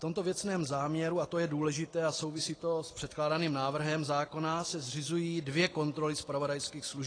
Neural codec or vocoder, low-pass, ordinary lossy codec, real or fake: none; 9.9 kHz; AAC, 32 kbps; real